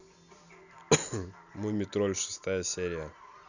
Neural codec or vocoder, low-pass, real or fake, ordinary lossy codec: none; 7.2 kHz; real; none